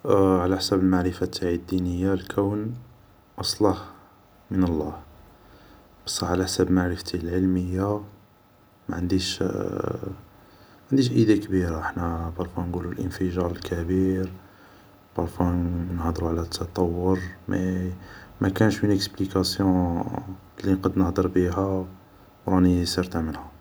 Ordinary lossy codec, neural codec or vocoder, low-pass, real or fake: none; none; none; real